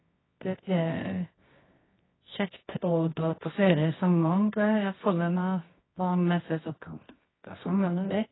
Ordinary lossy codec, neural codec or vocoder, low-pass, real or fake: AAC, 16 kbps; codec, 24 kHz, 0.9 kbps, WavTokenizer, medium music audio release; 7.2 kHz; fake